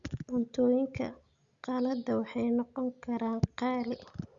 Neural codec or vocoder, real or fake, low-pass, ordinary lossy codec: none; real; 7.2 kHz; Opus, 64 kbps